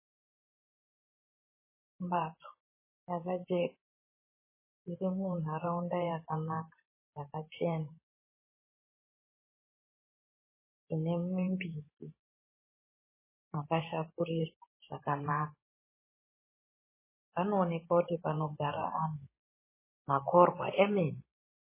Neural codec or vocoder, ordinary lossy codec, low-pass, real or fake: vocoder, 44.1 kHz, 128 mel bands every 512 samples, BigVGAN v2; MP3, 16 kbps; 3.6 kHz; fake